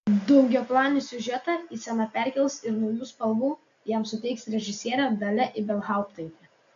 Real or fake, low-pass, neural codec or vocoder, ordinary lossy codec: real; 7.2 kHz; none; AAC, 64 kbps